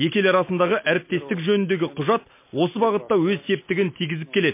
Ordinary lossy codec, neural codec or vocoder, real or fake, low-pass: MP3, 24 kbps; none; real; 3.6 kHz